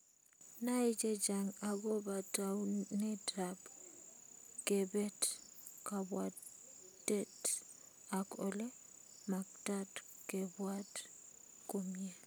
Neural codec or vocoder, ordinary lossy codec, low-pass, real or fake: none; none; none; real